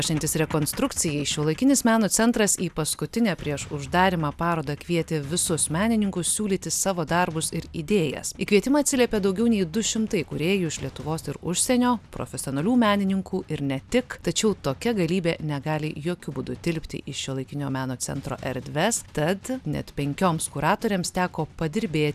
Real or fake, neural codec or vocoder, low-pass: real; none; 14.4 kHz